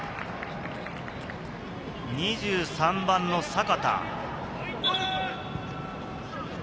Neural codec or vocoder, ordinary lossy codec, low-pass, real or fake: none; none; none; real